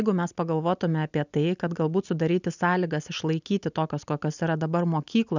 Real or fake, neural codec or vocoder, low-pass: real; none; 7.2 kHz